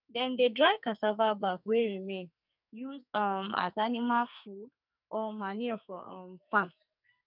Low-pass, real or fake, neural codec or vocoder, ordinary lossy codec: 5.4 kHz; fake; codec, 44.1 kHz, 2.6 kbps, SNAC; none